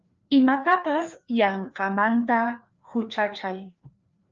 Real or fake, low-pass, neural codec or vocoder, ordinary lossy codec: fake; 7.2 kHz; codec, 16 kHz, 2 kbps, FreqCodec, larger model; Opus, 24 kbps